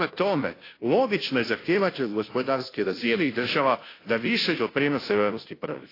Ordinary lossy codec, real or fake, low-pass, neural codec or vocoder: AAC, 24 kbps; fake; 5.4 kHz; codec, 16 kHz, 0.5 kbps, FunCodec, trained on Chinese and English, 25 frames a second